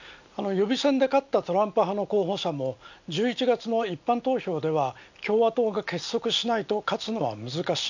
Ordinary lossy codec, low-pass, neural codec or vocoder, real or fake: none; 7.2 kHz; none; real